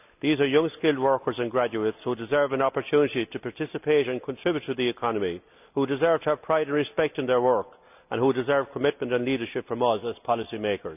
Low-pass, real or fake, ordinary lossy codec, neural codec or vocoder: 3.6 kHz; real; none; none